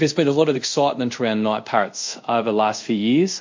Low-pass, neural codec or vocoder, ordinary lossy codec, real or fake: 7.2 kHz; codec, 24 kHz, 0.5 kbps, DualCodec; MP3, 48 kbps; fake